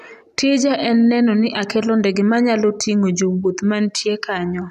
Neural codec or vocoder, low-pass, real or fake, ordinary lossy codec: none; 14.4 kHz; real; none